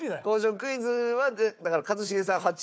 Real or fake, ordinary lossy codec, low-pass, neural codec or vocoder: fake; none; none; codec, 16 kHz, 4 kbps, FunCodec, trained on Chinese and English, 50 frames a second